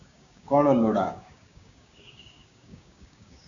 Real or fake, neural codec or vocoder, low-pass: fake; codec, 16 kHz, 6 kbps, DAC; 7.2 kHz